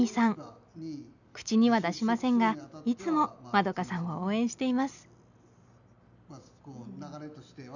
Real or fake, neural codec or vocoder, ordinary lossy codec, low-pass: real; none; none; 7.2 kHz